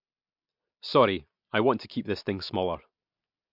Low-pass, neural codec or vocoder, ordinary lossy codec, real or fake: 5.4 kHz; none; MP3, 48 kbps; real